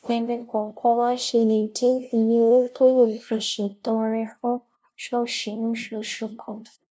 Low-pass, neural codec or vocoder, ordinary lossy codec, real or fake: none; codec, 16 kHz, 0.5 kbps, FunCodec, trained on LibriTTS, 25 frames a second; none; fake